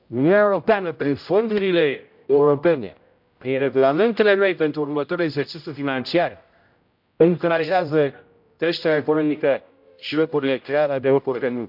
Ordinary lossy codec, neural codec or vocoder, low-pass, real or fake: MP3, 48 kbps; codec, 16 kHz, 0.5 kbps, X-Codec, HuBERT features, trained on general audio; 5.4 kHz; fake